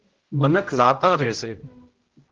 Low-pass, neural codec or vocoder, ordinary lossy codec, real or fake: 7.2 kHz; codec, 16 kHz, 0.5 kbps, X-Codec, HuBERT features, trained on general audio; Opus, 16 kbps; fake